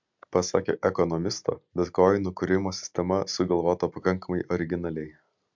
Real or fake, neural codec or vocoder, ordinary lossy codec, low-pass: real; none; MP3, 64 kbps; 7.2 kHz